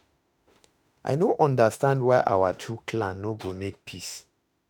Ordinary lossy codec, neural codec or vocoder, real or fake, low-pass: none; autoencoder, 48 kHz, 32 numbers a frame, DAC-VAE, trained on Japanese speech; fake; none